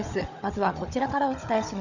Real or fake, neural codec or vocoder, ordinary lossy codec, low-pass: fake; codec, 16 kHz, 16 kbps, FunCodec, trained on Chinese and English, 50 frames a second; none; 7.2 kHz